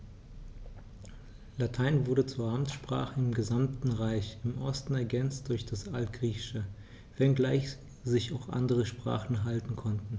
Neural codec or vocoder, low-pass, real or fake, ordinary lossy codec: none; none; real; none